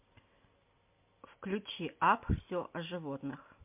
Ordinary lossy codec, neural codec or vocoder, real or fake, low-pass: MP3, 32 kbps; none; real; 3.6 kHz